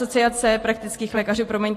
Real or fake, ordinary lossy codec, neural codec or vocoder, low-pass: fake; AAC, 48 kbps; vocoder, 44.1 kHz, 128 mel bands every 512 samples, BigVGAN v2; 14.4 kHz